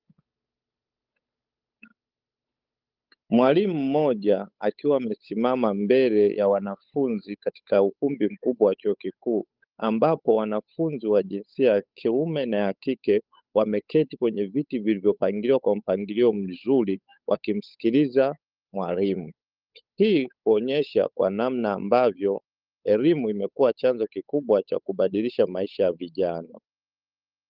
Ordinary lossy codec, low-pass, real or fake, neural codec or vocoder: Opus, 24 kbps; 5.4 kHz; fake; codec, 16 kHz, 8 kbps, FunCodec, trained on Chinese and English, 25 frames a second